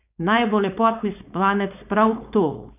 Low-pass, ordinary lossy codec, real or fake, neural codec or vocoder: 3.6 kHz; none; fake; codec, 16 kHz, 4.8 kbps, FACodec